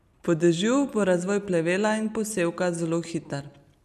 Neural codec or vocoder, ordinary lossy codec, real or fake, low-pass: none; none; real; 14.4 kHz